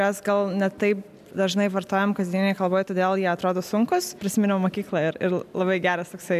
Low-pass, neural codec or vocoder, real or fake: 14.4 kHz; none; real